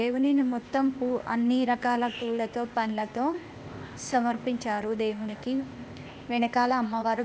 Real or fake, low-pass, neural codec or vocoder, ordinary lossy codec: fake; none; codec, 16 kHz, 0.8 kbps, ZipCodec; none